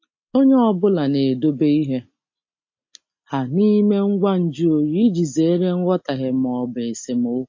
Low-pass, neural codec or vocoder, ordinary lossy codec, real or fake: 7.2 kHz; none; MP3, 32 kbps; real